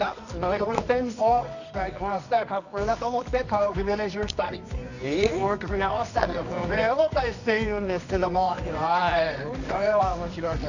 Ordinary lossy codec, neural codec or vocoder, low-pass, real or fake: none; codec, 24 kHz, 0.9 kbps, WavTokenizer, medium music audio release; 7.2 kHz; fake